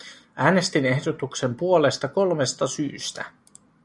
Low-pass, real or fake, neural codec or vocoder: 10.8 kHz; real; none